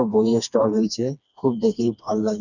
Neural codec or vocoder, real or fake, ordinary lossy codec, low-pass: codec, 16 kHz, 2 kbps, FreqCodec, smaller model; fake; none; 7.2 kHz